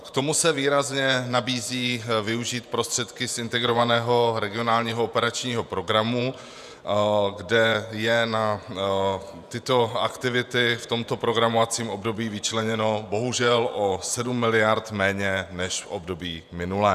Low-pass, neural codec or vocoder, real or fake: 14.4 kHz; vocoder, 48 kHz, 128 mel bands, Vocos; fake